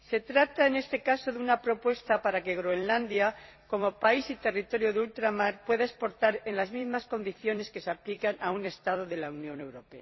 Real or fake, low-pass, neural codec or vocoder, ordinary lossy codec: fake; 7.2 kHz; vocoder, 44.1 kHz, 128 mel bands every 256 samples, BigVGAN v2; MP3, 24 kbps